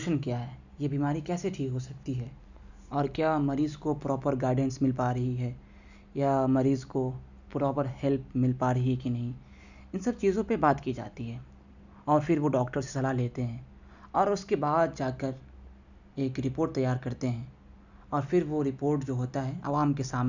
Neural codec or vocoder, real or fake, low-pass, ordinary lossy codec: none; real; 7.2 kHz; none